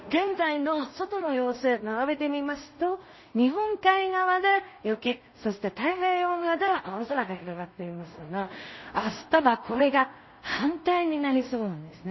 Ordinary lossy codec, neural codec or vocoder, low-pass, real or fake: MP3, 24 kbps; codec, 16 kHz in and 24 kHz out, 0.4 kbps, LongCat-Audio-Codec, two codebook decoder; 7.2 kHz; fake